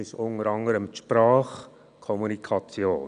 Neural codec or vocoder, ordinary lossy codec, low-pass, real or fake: none; none; 9.9 kHz; real